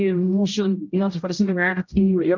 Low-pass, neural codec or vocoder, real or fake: 7.2 kHz; codec, 16 kHz, 0.5 kbps, X-Codec, HuBERT features, trained on general audio; fake